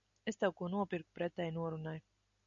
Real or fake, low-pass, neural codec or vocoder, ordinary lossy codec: real; 7.2 kHz; none; MP3, 96 kbps